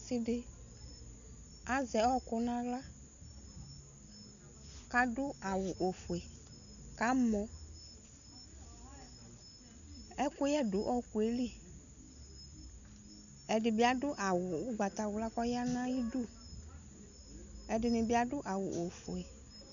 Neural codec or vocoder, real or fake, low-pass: none; real; 7.2 kHz